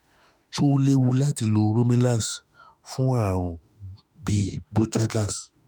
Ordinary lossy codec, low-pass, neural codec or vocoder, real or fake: none; none; autoencoder, 48 kHz, 32 numbers a frame, DAC-VAE, trained on Japanese speech; fake